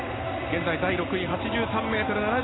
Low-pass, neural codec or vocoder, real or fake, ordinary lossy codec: 7.2 kHz; none; real; AAC, 16 kbps